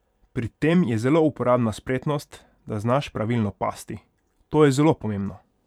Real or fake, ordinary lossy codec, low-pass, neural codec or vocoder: fake; none; 19.8 kHz; vocoder, 44.1 kHz, 128 mel bands every 512 samples, BigVGAN v2